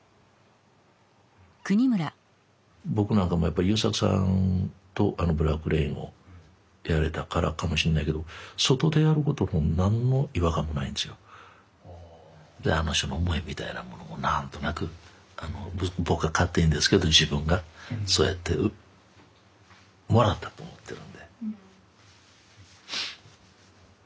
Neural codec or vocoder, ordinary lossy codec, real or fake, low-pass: none; none; real; none